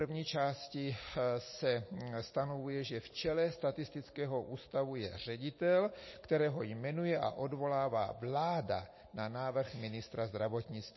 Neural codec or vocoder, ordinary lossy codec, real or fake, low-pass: none; MP3, 24 kbps; real; 7.2 kHz